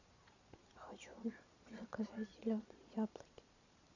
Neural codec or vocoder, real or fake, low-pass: vocoder, 22.05 kHz, 80 mel bands, Vocos; fake; 7.2 kHz